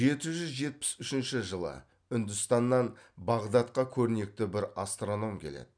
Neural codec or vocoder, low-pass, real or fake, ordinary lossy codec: none; 9.9 kHz; real; none